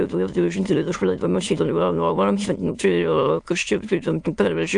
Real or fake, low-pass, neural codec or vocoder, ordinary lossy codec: fake; 9.9 kHz; autoencoder, 22.05 kHz, a latent of 192 numbers a frame, VITS, trained on many speakers; AAC, 64 kbps